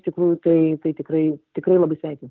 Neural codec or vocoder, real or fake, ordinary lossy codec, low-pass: none; real; Opus, 32 kbps; 7.2 kHz